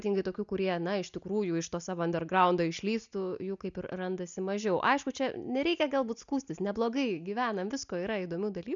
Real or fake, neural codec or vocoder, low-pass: real; none; 7.2 kHz